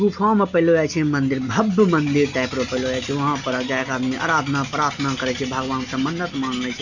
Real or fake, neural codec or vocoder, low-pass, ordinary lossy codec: real; none; 7.2 kHz; none